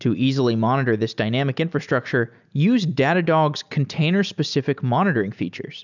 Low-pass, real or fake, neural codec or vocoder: 7.2 kHz; real; none